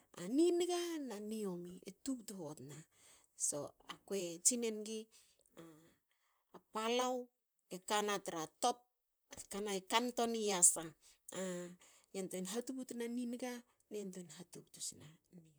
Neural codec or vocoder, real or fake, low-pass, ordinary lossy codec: vocoder, 44.1 kHz, 128 mel bands, Pupu-Vocoder; fake; none; none